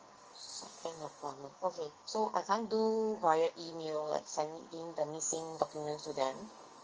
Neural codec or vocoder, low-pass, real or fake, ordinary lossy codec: codec, 44.1 kHz, 2.6 kbps, SNAC; 7.2 kHz; fake; Opus, 24 kbps